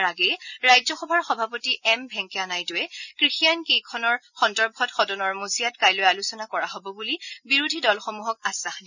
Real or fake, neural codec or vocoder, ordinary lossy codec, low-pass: real; none; none; 7.2 kHz